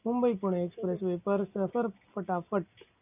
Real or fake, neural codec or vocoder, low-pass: real; none; 3.6 kHz